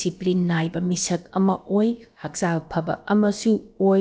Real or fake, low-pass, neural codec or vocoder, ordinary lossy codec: fake; none; codec, 16 kHz, about 1 kbps, DyCAST, with the encoder's durations; none